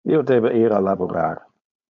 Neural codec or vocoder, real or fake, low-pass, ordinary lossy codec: codec, 16 kHz, 4.8 kbps, FACodec; fake; 7.2 kHz; MP3, 64 kbps